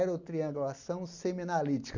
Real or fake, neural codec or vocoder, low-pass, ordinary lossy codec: real; none; 7.2 kHz; none